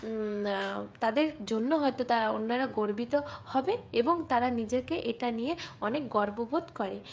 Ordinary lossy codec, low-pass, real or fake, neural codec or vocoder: none; none; fake; codec, 16 kHz, 16 kbps, FreqCodec, smaller model